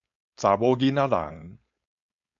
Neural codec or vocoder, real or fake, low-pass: codec, 16 kHz, 4.8 kbps, FACodec; fake; 7.2 kHz